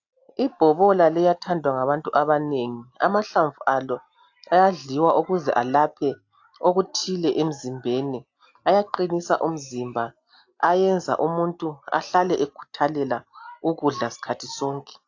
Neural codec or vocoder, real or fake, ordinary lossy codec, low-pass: none; real; AAC, 48 kbps; 7.2 kHz